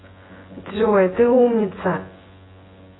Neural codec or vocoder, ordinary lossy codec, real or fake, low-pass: vocoder, 24 kHz, 100 mel bands, Vocos; AAC, 16 kbps; fake; 7.2 kHz